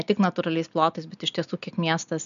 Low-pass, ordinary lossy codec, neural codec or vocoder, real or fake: 7.2 kHz; MP3, 96 kbps; none; real